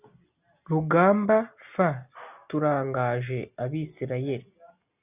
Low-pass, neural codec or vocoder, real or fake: 3.6 kHz; none; real